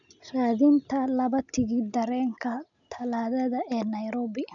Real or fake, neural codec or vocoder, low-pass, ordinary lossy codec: real; none; 7.2 kHz; none